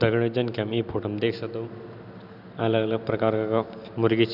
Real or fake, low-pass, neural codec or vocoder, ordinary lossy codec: real; 5.4 kHz; none; none